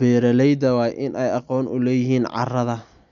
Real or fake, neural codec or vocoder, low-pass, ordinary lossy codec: real; none; 7.2 kHz; none